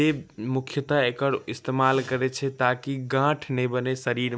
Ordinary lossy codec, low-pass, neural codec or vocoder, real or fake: none; none; none; real